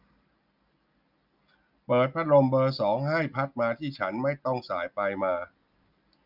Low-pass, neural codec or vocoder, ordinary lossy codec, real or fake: 5.4 kHz; none; none; real